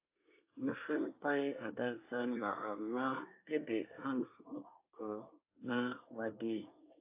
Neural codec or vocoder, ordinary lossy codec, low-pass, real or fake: codec, 24 kHz, 1 kbps, SNAC; AAC, 32 kbps; 3.6 kHz; fake